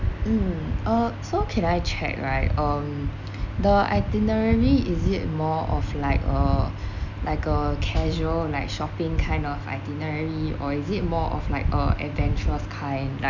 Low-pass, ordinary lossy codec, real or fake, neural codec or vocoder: 7.2 kHz; none; real; none